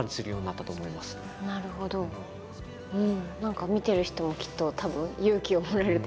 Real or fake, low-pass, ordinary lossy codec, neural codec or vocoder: real; none; none; none